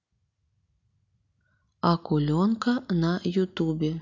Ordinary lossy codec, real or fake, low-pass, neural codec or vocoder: none; real; 7.2 kHz; none